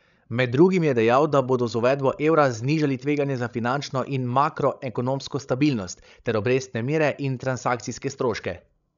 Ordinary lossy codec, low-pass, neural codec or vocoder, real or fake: none; 7.2 kHz; codec, 16 kHz, 16 kbps, FreqCodec, larger model; fake